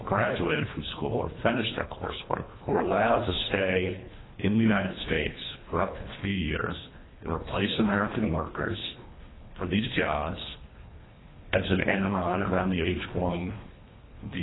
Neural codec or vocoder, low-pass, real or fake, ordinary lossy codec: codec, 24 kHz, 1.5 kbps, HILCodec; 7.2 kHz; fake; AAC, 16 kbps